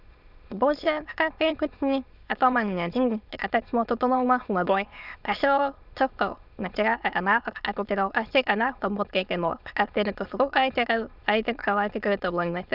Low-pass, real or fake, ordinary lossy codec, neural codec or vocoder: 5.4 kHz; fake; Opus, 64 kbps; autoencoder, 22.05 kHz, a latent of 192 numbers a frame, VITS, trained on many speakers